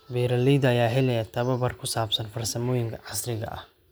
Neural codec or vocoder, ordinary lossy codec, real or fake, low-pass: none; none; real; none